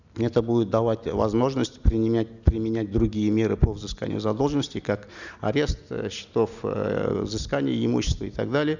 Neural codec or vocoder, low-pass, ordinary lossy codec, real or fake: none; 7.2 kHz; none; real